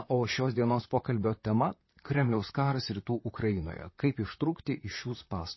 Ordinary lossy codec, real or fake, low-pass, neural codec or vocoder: MP3, 24 kbps; fake; 7.2 kHz; vocoder, 44.1 kHz, 128 mel bands, Pupu-Vocoder